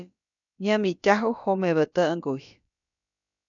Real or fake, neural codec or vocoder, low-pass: fake; codec, 16 kHz, about 1 kbps, DyCAST, with the encoder's durations; 7.2 kHz